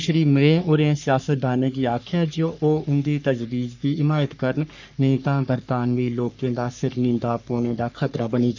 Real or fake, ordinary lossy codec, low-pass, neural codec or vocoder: fake; none; 7.2 kHz; codec, 44.1 kHz, 3.4 kbps, Pupu-Codec